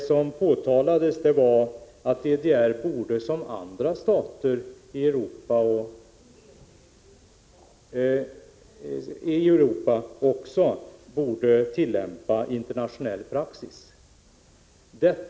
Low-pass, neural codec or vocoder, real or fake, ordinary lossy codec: none; none; real; none